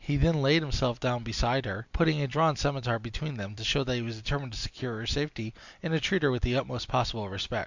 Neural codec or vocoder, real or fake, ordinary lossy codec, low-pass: none; real; Opus, 64 kbps; 7.2 kHz